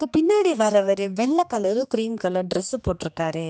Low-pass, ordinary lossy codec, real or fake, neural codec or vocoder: none; none; fake; codec, 16 kHz, 2 kbps, X-Codec, HuBERT features, trained on general audio